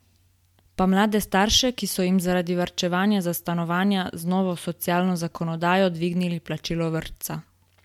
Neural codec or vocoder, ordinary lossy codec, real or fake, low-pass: none; MP3, 96 kbps; real; 19.8 kHz